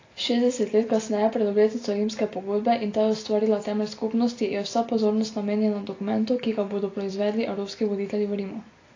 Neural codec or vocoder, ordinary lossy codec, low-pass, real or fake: none; AAC, 32 kbps; 7.2 kHz; real